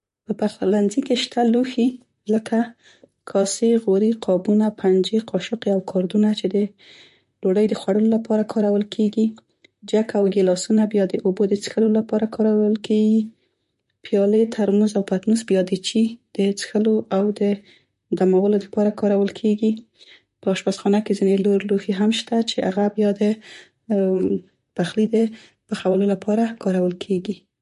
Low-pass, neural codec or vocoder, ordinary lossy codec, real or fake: 14.4 kHz; vocoder, 44.1 kHz, 128 mel bands, Pupu-Vocoder; MP3, 48 kbps; fake